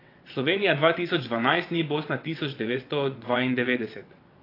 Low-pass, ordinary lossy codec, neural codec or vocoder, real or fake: 5.4 kHz; AAC, 32 kbps; vocoder, 22.05 kHz, 80 mel bands, WaveNeXt; fake